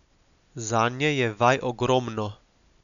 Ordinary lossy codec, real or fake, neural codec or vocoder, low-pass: none; real; none; 7.2 kHz